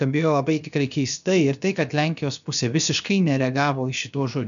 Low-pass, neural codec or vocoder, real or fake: 7.2 kHz; codec, 16 kHz, 0.7 kbps, FocalCodec; fake